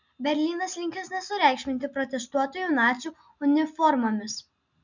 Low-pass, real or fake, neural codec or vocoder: 7.2 kHz; real; none